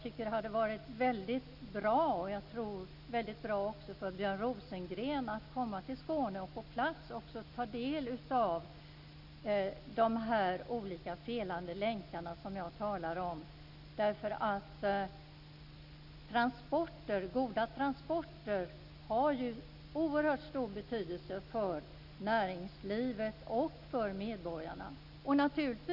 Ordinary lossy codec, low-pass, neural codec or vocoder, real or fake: none; 5.4 kHz; none; real